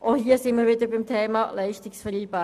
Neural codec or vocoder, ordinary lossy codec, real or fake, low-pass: none; none; real; 14.4 kHz